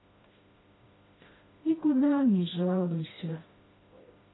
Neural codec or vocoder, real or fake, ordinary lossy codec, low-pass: codec, 16 kHz, 1 kbps, FreqCodec, smaller model; fake; AAC, 16 kbps; 7.2 kHz